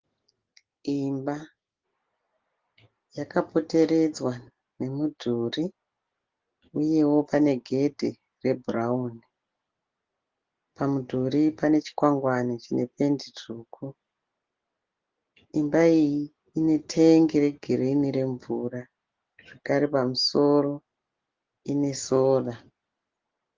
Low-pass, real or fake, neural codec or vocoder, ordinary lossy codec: 7.2 kHz; real; none; Opus, 16 kbps